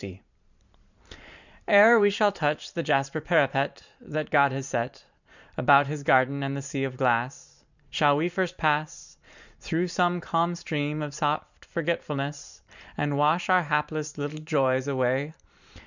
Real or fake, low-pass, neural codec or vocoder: fake; 7.2 kHz; vocoder, 44.1 kHz, 128 mel bands every 512 samples, BigVGAN v2